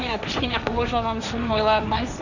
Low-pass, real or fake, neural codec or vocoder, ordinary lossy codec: 7.2 kHz; fake; codec, 16 kHz, 1.1 kbps, Voila-Tokenizer; none